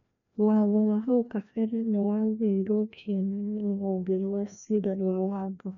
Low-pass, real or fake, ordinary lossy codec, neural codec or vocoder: 7.2 kHz; fake; none; codec, 16 kHz, 1 kbps, FreqCodec, larger model